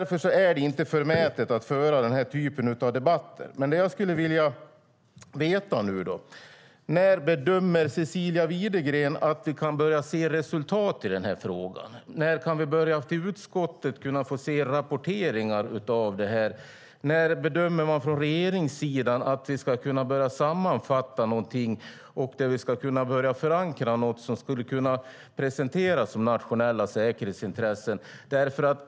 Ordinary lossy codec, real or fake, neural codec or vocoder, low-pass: none; real; none; none